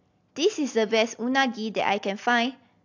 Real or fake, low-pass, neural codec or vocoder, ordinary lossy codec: real; 7.2 kHz; none; AAC, 48 kbps